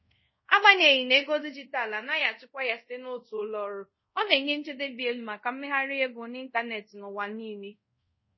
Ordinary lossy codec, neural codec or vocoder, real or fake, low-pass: MP3, 24 kbps; codec, 24 kHz, 0.5 kbps, DualCodec; fake; 7.2 kHz